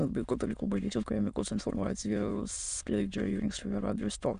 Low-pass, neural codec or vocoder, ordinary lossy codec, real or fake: 9.9 kHz; autoencoder, 22.05 kHz, a latent of 192 numbers a frame, VITS, trained on many speakers; MP3, 96 kbps; fake